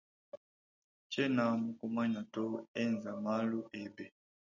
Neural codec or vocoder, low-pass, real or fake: none; 7.2 kHz; real